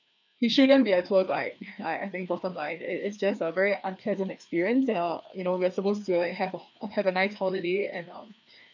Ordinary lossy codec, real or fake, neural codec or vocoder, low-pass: none; fake; codec, 16 kHz, 2 kbps, FreqCodec, larger model; 7.2 kHz